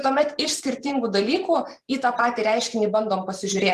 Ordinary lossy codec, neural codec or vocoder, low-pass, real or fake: Opus, 24 kbps; vocoder, 44.1 kHz, 128 mel bands every 512 samples, BigVGAN v2; 14.4 kHz; fake